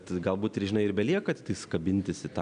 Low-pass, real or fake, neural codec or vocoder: 9.9 kHz; real; none